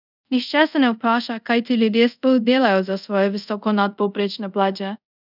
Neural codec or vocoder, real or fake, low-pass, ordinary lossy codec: codec, 24 kHz, 0.5 kbps, DualCodec; fake; 5.4 kHz; none